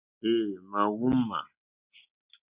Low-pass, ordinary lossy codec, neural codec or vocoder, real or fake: 3.6 kHz; Opus, 64 kbps; none; real